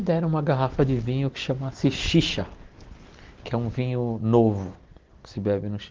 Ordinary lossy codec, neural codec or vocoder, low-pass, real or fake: Opus, 16 kbps; none; 7.2 kHz; real